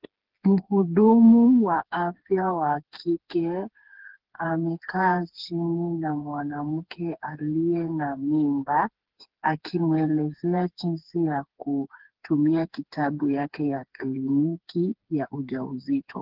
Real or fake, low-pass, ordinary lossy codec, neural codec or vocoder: fake; 5.4 kHz; Opus, 16 kbps; codec, 16 kHz, 4 kbps, FreqCodec, smaller model